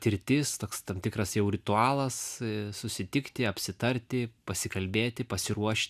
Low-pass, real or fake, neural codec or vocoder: 14.4 kHz; real; none